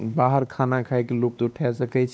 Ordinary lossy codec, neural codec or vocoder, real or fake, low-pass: none; codec, 16 kHz, 2 kbps, X-Codec, WavLM features, trained on Multilingual LibriSpeech; fake; none